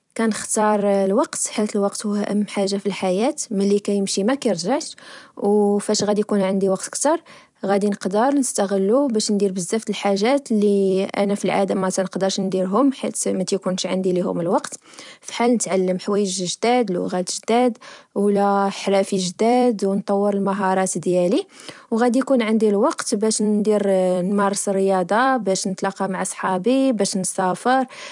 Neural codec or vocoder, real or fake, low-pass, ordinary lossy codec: vocoder, 44.1 kHz, 128 mel bands every 256 samples, BigVGAN v2; fake; 10.8 kHz; none